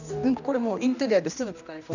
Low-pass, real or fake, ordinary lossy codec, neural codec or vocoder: 7.2 kHz; fake; none; codec, 16 kHz, 1 kbps, X-Codec, HuBERT features, trained on general audio